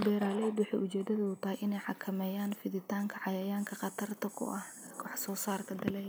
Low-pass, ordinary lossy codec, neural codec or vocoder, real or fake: none; none; none; real